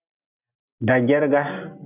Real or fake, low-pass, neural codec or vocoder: real; 3.6 kHz; none